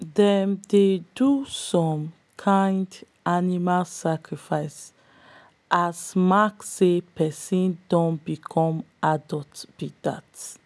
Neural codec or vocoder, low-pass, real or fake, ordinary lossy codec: none; none; real; none